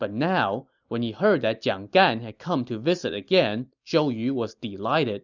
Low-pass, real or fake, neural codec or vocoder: 7.2 kHz; real; none